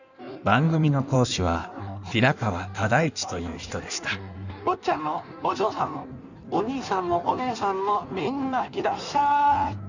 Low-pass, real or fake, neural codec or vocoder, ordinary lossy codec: 7.2 kHz; fake; codec, 16 kHz in and 24 kHz out, 1.1 kbps, FireRedTTS-2 codec; none